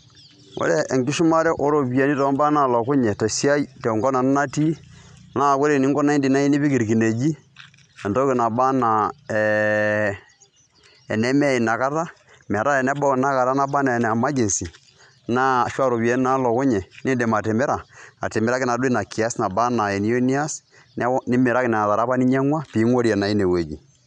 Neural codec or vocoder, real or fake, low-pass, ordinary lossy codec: none; real; 9.9 kHz; none